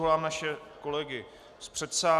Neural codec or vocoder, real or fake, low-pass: none; real; 14.4 kHz